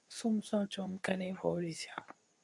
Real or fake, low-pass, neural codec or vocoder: fake; 10.8 kHz; codec, 24 kHz, 0.9 kbps, WavTokenizer, medium speech release version 2